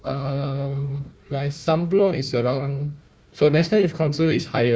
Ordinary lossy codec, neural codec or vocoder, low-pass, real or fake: none; codec, 16 kHz, 1 kbps, FunCodec, trained on Chinese and English, 50 frames a second; none; fake